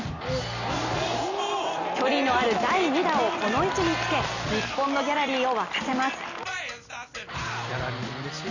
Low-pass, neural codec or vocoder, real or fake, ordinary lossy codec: 7.2 kHz; none; real; none